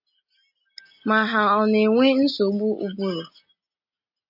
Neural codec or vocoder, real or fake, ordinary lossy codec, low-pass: none; real; AAC, 48 kbps; 5.4 kHz